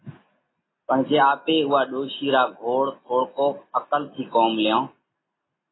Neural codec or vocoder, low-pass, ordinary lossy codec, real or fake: none; 7.2 kHz; AAC, 16 kbps; real